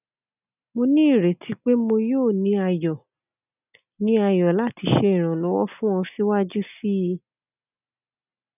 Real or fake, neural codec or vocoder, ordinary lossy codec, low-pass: real; none; none; 3.6 kHz